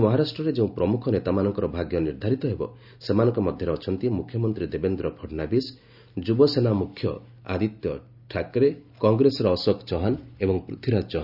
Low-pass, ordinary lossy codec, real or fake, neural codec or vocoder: 5.4 kHz; none; real; none